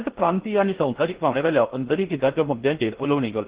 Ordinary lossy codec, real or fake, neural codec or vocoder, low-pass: Opus, 16 kbps; fake; codec, 16 kHz in and 24 kHz out, 0.8 kbps, FocalCodec, streaming, 65536 codes; 3.6 kHz